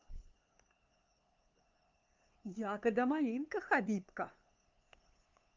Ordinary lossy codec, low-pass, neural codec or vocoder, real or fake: Opus, 24 kbps; 7.2 kHz; codec, 16 kHz, 2 kbps, FunCodec, trained on LibriTTS, 25 frames a second; fake